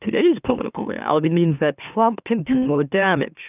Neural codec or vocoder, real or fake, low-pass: autoencoder, 44.1 kHz, a latent of 192 numbers a frame, MeloTTS; fake; 3.6 kHz